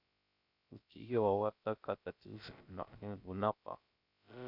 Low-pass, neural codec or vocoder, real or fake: 5.4 kHz; codec, 16 kHz, 0.3 kbps, FocalCodec; fake